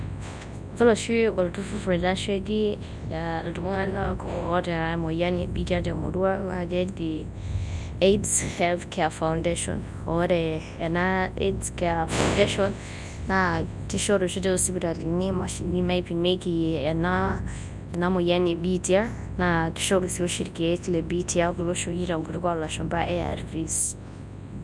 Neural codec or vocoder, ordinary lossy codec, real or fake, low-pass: codec, 24 kHz, 0.9 kbps, WavTokenizer, large speech release; none; fake; none